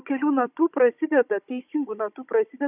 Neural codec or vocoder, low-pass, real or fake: codec, 16 kHz, 16 kbps, FunCodec, trained on Chinese and English, 50 frames a second; 3.6 kHz; fake